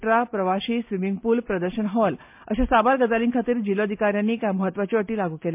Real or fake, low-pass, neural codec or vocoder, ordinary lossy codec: real; 3.6 kHz; none; MP3, 32 kbps